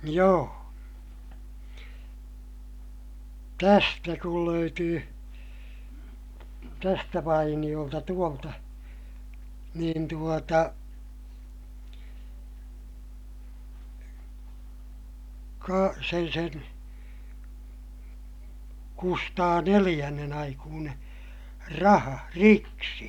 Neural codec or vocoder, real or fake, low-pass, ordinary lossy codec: none; real; 19.8 kHz; none